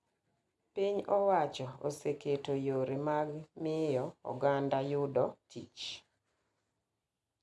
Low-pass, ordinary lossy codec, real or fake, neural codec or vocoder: none; none; real; none